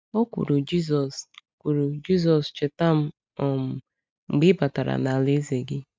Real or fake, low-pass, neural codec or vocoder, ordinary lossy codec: real; none; none; none